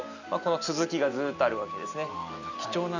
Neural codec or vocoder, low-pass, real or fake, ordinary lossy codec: none; 7.2 kHz; real; none